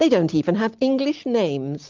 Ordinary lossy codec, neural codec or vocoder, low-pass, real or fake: Opus, 32 kbps; none; 7.2 kHz; real